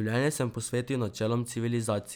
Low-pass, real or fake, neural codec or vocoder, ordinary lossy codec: none; real; none; none